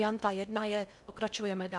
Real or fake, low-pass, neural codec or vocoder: fake; 10.8 kHz; codec, 16 kHz in and 24 kHz out, 0.8 kbps, FocalCodec, streaming, 65536 codes